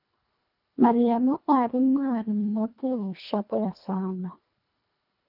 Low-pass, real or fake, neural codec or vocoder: 5.4 kHz; fake; codec, 24 kHz, 1.5 kbps, HILCodec